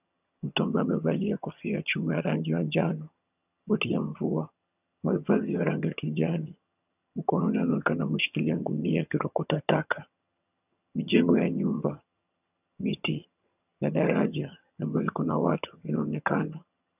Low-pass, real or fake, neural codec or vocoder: 3.6 kHz; fake; vocoder, 22.05 kHz, 80 mel bands, HiFi-GAN